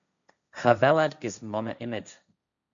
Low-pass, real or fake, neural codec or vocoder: 7.2 kHz; fake; codec, 16 kHz, 1.1 kbps, Voila-Tokenizer